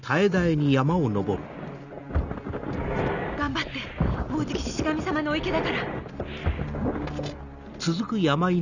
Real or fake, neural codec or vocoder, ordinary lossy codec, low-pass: real; none; none; 7.2 kHz